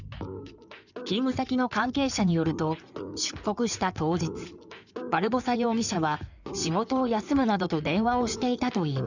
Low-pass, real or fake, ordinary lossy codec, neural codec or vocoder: 7.2 kHz; fake; none; codec, 16 kHz in and 24 kHz out, 2.2 kbps, FireRedTTS-2 codec